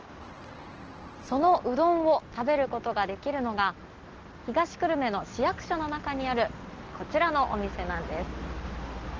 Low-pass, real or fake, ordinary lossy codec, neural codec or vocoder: 7.2 kHz; real; Opus, 16 kbps; none